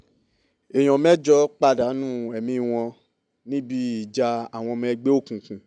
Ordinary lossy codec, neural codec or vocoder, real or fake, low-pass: none; none; real; 9.9 kHz